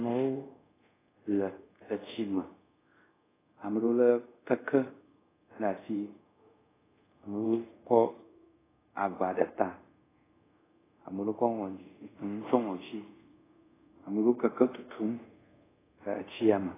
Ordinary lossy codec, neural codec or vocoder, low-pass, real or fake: AAC, 16 kbps; codec, 24 kHz, 0.5 kbps, DualCodec; 3.6 kHz; fake